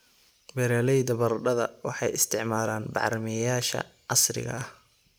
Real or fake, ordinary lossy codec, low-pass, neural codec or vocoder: real; none; none; none